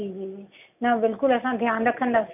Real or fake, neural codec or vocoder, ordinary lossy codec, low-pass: real; none; none; 3.6 kHz